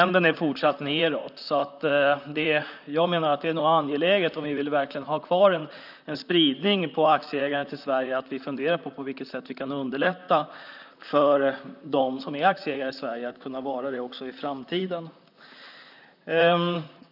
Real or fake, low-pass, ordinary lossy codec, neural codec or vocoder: fake; 5.4 kHz; none; vocoder, 44.1 kHz, 128 mel bands, Pupu-Vocoder